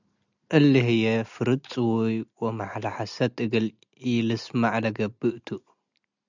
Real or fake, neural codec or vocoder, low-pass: real; none; 7.2 kHz